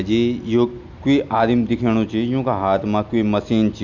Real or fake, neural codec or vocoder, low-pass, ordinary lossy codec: real; none; 7.2 kHz; none